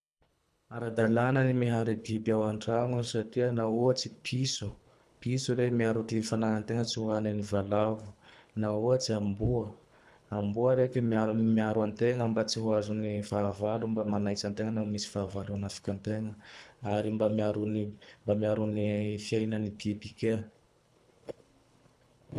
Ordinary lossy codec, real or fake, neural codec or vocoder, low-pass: none; fake; codec, 24 kHz, 3 kbps, HILCodec; none